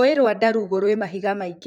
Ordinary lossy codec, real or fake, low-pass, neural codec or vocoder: none; fake; 19.8 kHz; vocoder, 44.1 kHz, 128 mel bands, Pupu-Vocoder